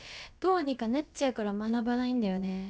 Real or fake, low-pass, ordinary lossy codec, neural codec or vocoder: fake; none; none; codec, 16 kHz, about 1 kbps, DyCAST, with the encoder's durations